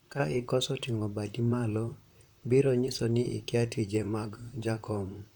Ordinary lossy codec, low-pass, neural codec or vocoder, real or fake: none; 19.8 kHz; vocoder, 44.1 kHz, 128 mel bands, Pupu-Vocoder; fake